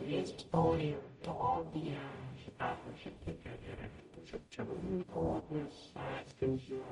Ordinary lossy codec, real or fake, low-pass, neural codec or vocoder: MP3, 48 kbps; fake; 19.8 kHz; codec, 44.1 kHz, 0.9 kbps, DAC